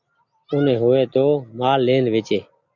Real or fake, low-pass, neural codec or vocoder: real; 7.2 kHz; none